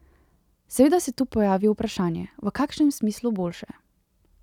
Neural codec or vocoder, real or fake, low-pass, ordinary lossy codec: none; real; 19.8 kHz; none